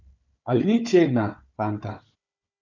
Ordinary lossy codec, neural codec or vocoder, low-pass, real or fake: none; codec, 16 kHz, 4 kbps, FunCodec, trained on Chinese and English, 50 frames a second; 7.2 kHz; fake